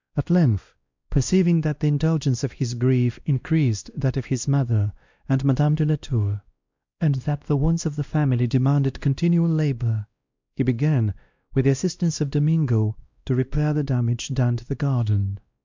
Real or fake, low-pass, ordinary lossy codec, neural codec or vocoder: fake; 7.2 kHz; MP3, 64 kbps; codec, 16 kHz, 1 kbps, X-Codec, WavLM features, trained on Multilingual LibriSpeech